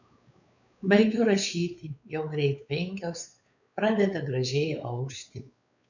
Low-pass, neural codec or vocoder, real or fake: 7.2 kHz; codec, 16 kHz, 4 kbps, X-Codec, WavLM features, trained on Multilingual LibriSpeech; fake